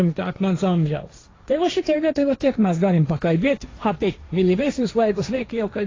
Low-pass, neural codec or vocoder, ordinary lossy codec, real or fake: 7.2 kHz; codec, 16 kHz, 1.1 kbps, Voila-Tokenizer; AAC, 32 kbps; fake